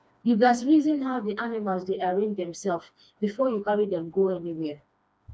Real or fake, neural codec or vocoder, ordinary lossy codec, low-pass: fake; codec, 16 kHz, 2 kbps, FreqCodec, smaller model; none; none